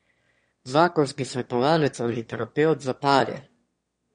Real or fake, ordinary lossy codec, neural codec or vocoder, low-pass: fake; MP3, 48 kbps; autoencoder, 22.05 kHz, a latent of 192 numbers a frame, VITS, trained on one speaker; 9.9 kHz